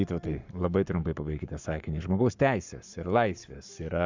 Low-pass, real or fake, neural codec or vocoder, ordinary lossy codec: 7.2 kHz; fake; codec, 44.1 kHz, 7.8 kbps, Pupu-Codec; Opus, 64 kbps